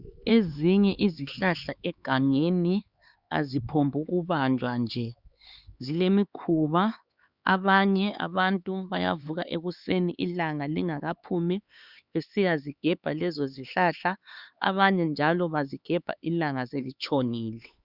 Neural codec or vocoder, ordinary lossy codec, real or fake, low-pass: codec, 16 kHz, 4 kbps, X-Codec, HuBERT features, trained on LibriSpeech; Opus, 64 kbps; fake; 5.4 kHz